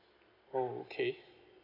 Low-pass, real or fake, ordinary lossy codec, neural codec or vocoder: 5.4 kHz; real; MP3, 48 kbps; none